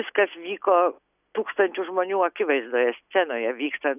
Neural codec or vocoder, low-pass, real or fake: none; 3.6 kHz; real